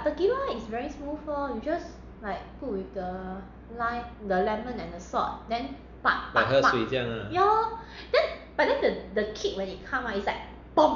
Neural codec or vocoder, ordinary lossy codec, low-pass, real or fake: none; none; 7.2 kHz; real